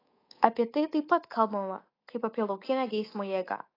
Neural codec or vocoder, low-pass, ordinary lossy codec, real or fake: codec, 24 kHz, 3.1 kbps, DualCodec; 5.4 kHz; AAC, 24 kbps; fake